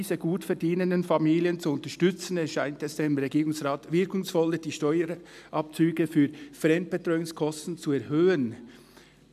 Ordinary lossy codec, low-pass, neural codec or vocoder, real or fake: MP3, 96 kbps; 14.4 kHz; none; real